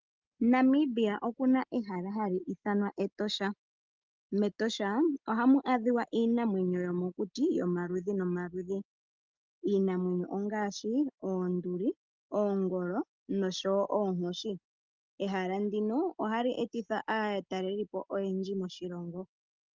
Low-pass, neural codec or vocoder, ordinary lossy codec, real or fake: 7.2 kHz; none; Opus, 32 kbps; real